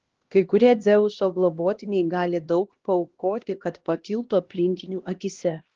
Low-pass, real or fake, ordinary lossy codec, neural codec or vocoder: 7.2 kHz; fake; Opus, 16 kbps; codec, 16 kHz, 1 kbps, X-Codec, HuBERT features, trained on LibriSpeech